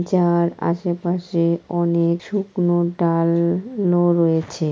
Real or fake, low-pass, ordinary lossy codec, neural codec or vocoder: real; none; none; none